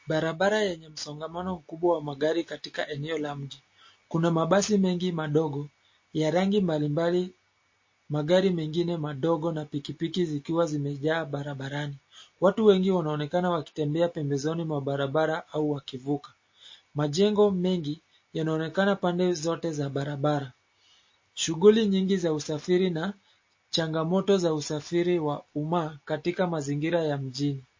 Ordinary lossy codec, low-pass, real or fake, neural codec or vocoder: MP3, 32 kbps; 7.2 kHz; real; none